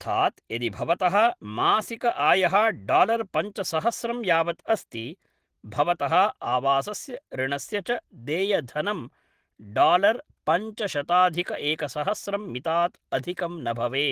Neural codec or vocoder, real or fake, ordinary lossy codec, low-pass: none; real; Opus, 16 kbps; 14.4 kHz